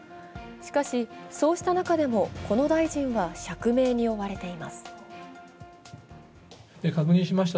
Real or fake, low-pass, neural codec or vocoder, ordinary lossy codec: real; none; none; none